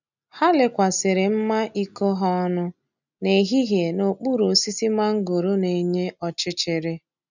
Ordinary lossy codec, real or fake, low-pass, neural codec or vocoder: none; real; 7.2 kHz; none